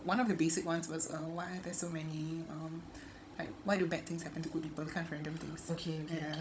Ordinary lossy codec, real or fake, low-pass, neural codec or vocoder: none; fake; none; codec, 16 kHz, 16 kbps, FunCodec, trained on Chinese and English, 50 frames a second